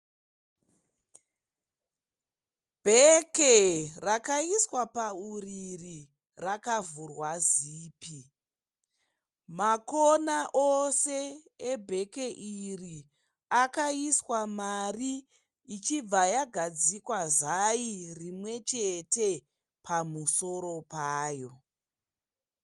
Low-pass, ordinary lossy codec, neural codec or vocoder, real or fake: 10.8 kHz; Opus, 32 kbps; none; real